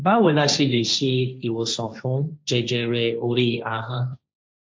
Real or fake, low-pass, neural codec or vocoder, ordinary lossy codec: fake; none; codec, 16 kHz, 1.1 kbps, Voila-Tokenizer; none